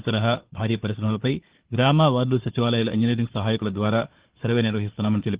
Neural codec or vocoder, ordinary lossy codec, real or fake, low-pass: codec, 16 kHz, 4 kbps, FunCodec, trained on Chinese and English, 50 frames a second; Opus, 16 kbps; fake; 3.6 kHz